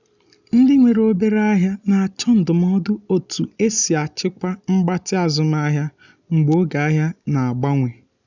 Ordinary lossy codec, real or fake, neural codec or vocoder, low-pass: none; real; none; 7.2 kHz